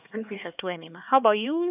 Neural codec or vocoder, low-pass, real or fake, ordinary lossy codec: codec, 16 kHz, 2 kbps, X-Codec, HuBERT features, trained on LibriSpeech; 3.6 kHz; fake; none